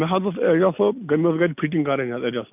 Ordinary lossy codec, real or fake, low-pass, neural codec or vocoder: none; real; 3.6 kHz; none